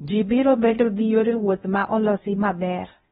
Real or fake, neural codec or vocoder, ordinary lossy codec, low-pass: fake; codec, 16 kHz in and 24 kHz out, 0.6 kbps, FocalCodec, streaming, 2048 codes; AAC, 16 kbps; 10.8 kHz